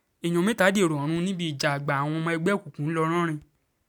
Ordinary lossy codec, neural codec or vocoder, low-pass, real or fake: none; none; none; real